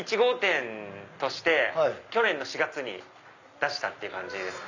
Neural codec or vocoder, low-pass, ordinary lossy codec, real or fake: none; 7.2 kHz; Opus, 64 kbps; real